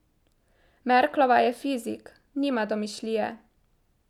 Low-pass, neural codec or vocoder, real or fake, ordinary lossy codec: 19.8 kHz; none; real; none